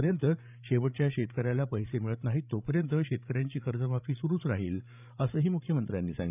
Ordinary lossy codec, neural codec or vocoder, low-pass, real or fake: none; codec, 16 kHz, 16 kbps, FreqCodec, larger model; 3.6 kHz; fake